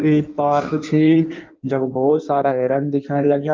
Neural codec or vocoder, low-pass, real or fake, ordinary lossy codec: codec, 16 kHz in and 24 kHz out, 1.1 kbps, FireRedTTS-2 codec; 7.2 kHz; fake; Opus, 24 kbps